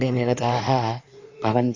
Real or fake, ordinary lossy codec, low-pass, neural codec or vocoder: fake; AAC, 48 kbps; 7.2 kHz; codec, 16 kHz in and 24 kHz out, 2.2 kbps, FireRedTTS-2 codec